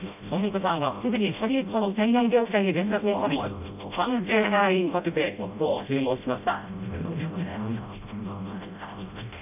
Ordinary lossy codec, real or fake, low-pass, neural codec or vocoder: none; fake; 3.6 kHz; codec, 16 kHz, 0.5 kbps, FreqCodec, smaller model